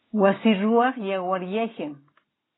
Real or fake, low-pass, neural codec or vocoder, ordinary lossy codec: real; 7.2 kHz; none; AAC, 16 kbps